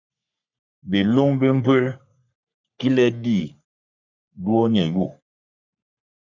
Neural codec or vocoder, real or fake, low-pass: codec, 44.1 kHz, 3.4 kbps, Pupu-Codec; fake; 7.2 kHz